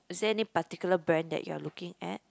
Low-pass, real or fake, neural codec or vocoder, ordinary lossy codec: none; real; none; none